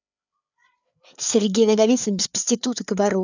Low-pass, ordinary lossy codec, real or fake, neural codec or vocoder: 7.2 kHz; none; fake; codec, 16 kHz, 4 kbps, FreqCodec, larger model